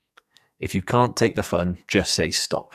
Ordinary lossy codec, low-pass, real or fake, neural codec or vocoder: none; 14.4 kHz; fake; codec, 44.1 kHz, 2.6 kbps, SNAC